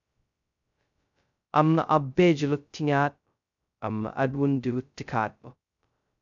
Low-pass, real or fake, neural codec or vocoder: 7.2 kHz; fake; codec, 16 kHz, 0.2 kbps, FocalCodec